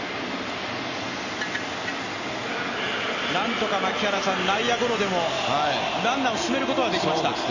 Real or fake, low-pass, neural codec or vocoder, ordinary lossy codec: real; 7.2 kHz; none; none